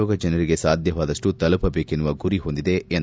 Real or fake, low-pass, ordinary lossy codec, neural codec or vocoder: real; none; none; none